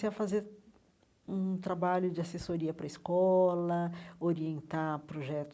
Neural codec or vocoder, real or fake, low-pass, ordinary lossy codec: none; real; none; none